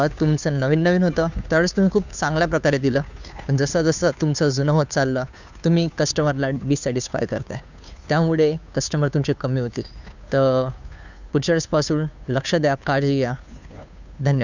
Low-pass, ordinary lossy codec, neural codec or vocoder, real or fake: 7.2 kHz; none; codec, 16 kHz, 2 kbps, FunCodec, trained on Chinese and English, 25 frames a second; fake